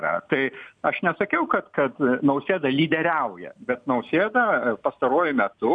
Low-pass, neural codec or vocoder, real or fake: 9.9 kHz; none; real